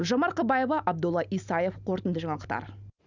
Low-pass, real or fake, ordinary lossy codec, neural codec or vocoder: 7.2 kHz; real; none; none